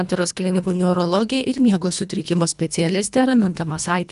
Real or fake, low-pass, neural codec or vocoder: fake; 10.8 kHz; codec, 24 kHz, 1.5 kbps, HILCodec